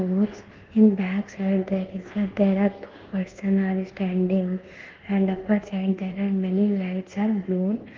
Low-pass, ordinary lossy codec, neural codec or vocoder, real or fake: 7.2 kHz; Opus, 16 kbps; codec, 24 kHz, 1.2 kbps, DualCodec; fake